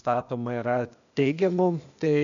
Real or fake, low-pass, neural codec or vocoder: fake; 7.2 kHz; codec, 16 kHz, 0.8 kbps, ZipCodec